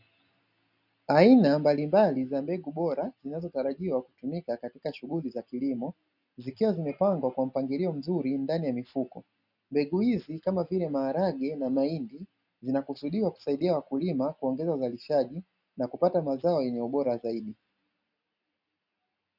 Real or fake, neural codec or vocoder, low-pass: real; none; 5.4 kHz